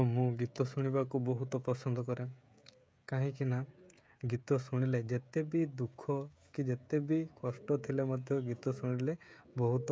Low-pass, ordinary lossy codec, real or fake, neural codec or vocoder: none; none; fake; codec, 16 kHz, 16 kbps, FreqCodec, smaller model